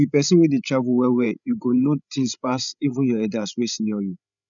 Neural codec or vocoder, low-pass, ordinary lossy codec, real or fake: codec, 16 kHz, 16 kbps, FreqCodec, larger model; 7.2 kHz; none; fake